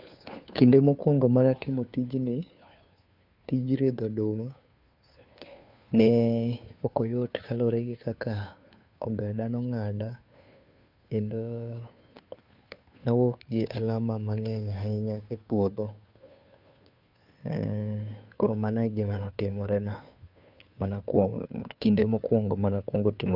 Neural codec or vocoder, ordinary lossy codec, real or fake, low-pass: codec, 16 kHz, 2 kbps, FunCodec, trained on Chinese and English, 25 frames a second; none; fake; 5.4 kHz